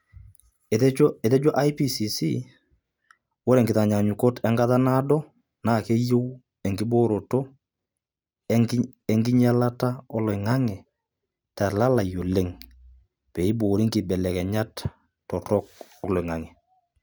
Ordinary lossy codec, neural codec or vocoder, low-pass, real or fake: none; none; none; real